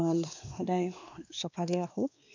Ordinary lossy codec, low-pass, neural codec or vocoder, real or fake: none; 7.2 kHz; codec, 16 kHz, 2 kbps, X-Codec, WavLM features, trained on Multilingual LibriSpeech; fake